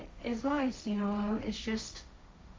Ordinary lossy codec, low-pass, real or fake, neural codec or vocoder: none; none; fake; codec, 16 kHz, 1.1 kbps, Voila-Tokenizer